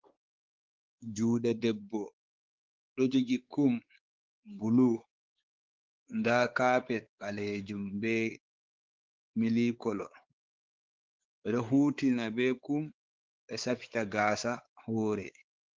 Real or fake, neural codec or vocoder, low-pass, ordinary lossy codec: fake; codec, 16 kHz, 4 kbps, X-Codec, WavLM features, trained on Multilingual LibriSpeech; 7.2 kHz; Opus, 16 kbps